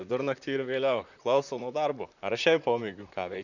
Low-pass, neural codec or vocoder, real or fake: 7.2 kHz; vocoder, 44.1 kHz, 128 mel bands, Pupu-Vocoder; fake